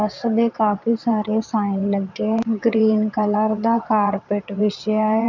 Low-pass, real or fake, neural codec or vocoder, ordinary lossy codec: 7.2 kHz; fake; vocoder, 22.05 kHz, 80 mel bands, WaveNeXt; none